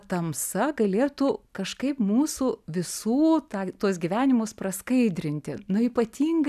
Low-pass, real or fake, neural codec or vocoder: 14.4 kHz; real; none